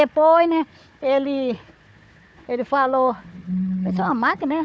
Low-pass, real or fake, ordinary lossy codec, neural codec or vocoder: none; fake; none; codec, 16 kHz, 4 kbps, FunCodec, trained on Chinese and English, 50 frames a second